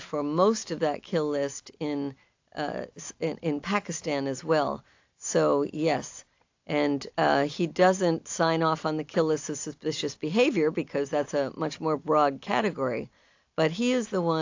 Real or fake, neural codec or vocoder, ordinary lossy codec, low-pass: real; none; AAC, 48 kbps; 7.2 kHz